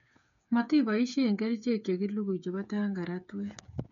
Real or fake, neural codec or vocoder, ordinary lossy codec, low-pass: fake; codec, 16 kHz, 8 kbps, FreqCodec, smaller model; none; 7.2 kHz